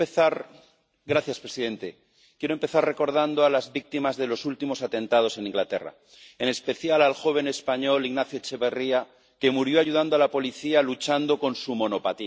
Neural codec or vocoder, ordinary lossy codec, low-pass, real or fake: none; none; none; real